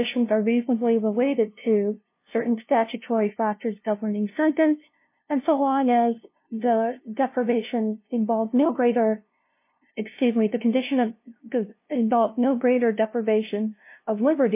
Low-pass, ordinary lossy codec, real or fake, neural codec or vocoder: 3.6 kHz; MP3, 24 kbps; fake; codec, 16 kHz, 0.5 kbps, FunCodec, trained on LibriTTS, 25 frames a second